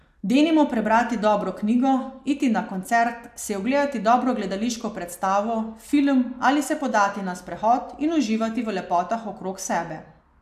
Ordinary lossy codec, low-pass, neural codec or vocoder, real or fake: AAC, 96 kbps; 14.4 kHz; none; real